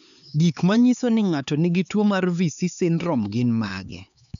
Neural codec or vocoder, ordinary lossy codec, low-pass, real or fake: codec, 16 kHz, 4 kbps, X-Codec, HuBERT features, trained on LibriSpeech; none; 7.2 kHz; fake